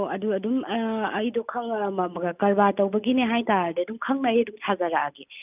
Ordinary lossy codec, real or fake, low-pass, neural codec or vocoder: none; real; 3.6 kHz; none